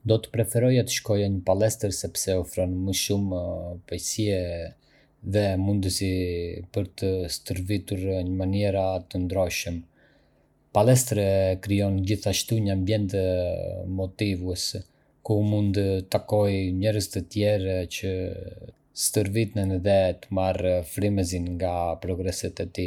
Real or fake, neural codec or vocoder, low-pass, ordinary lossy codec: real; none; 19.8 kHz; none